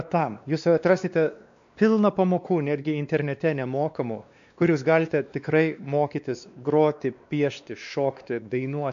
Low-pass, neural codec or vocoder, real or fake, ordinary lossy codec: 7.2 kHz; codec, 16 kHz, 2 kbps, X-Codec, WavLM features, trained on Multilingual LibriSpeech; fake; MP3, 64 kbps